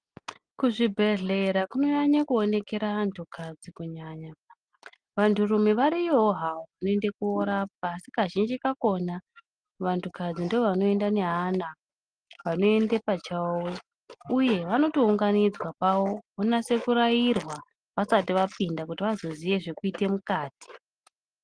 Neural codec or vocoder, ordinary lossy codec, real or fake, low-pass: none; Opus, 24 kbps; real; 9.9 kHz